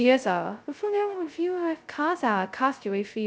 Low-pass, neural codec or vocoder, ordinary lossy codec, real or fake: none; codec, 16 kHz, 0.2 kbps, FocalCodec; none; fake